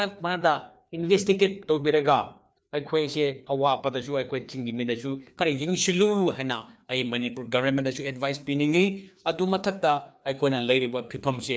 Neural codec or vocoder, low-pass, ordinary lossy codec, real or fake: codec, 16 kHz, 2 kbps, FreqCodec, larger model; none; none; fake